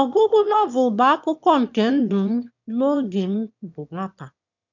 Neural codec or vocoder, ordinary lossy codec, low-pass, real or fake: autoencoder, 22.05 kHz, a latent of 192 numbers a frame, VITS, trained on one speaker; none; 7.2 kHz; fake